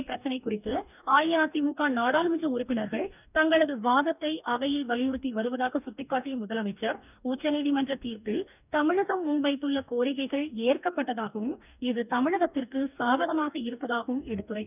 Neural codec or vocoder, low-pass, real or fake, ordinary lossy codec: codec, 44.1 kHz, 2.6 kbps, DAC; 3.6 kHz; fake; none